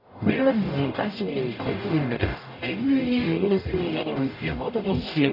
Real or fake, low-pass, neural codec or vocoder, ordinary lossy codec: fake; 5.4 kHz; codec, 44.1 kHz, 0.9 kbps, DAC; none